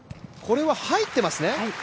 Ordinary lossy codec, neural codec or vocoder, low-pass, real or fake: none; none; none; real